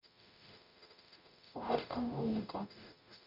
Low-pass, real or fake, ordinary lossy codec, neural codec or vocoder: 5.4 kHz; fake; none; codec, 44.1 kHz, 0.9 kbps, DAC